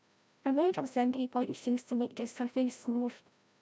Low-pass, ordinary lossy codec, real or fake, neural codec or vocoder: none; none; fake; codec, 16 kHz, 0.5 kbps, FreqCodec, larger model